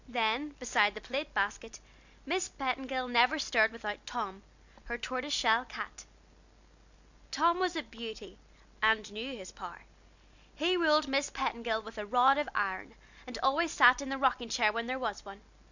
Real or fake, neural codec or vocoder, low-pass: real; none; 7.2 kHz